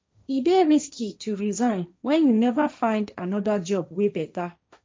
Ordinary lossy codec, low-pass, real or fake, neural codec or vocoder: none; none; fake; codec, 16 kHz, 1.1 kbps, Voila-Tokenizer